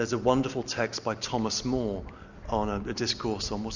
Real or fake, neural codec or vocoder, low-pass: real; none; 7.2 kHz